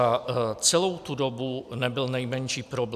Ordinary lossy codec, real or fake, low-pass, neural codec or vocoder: AAC, 96 kbps; real; 14.4 kHz; none